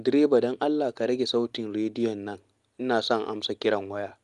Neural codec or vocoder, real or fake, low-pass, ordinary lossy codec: none; real; 10.8 kHz; Opus, 32 kbps